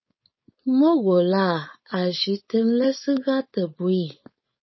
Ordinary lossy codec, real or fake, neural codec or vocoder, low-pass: MP3, 24 kbps; fake; codec, 16 kHz, 4.8 kbps, FACodec; 7.2 kHz